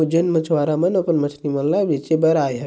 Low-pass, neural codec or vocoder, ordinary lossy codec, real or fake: none; none; none; real